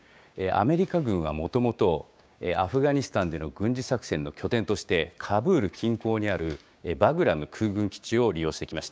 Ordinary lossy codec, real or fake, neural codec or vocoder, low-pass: none; fake; codec, 16 kHz, 6 kbps, DAC; none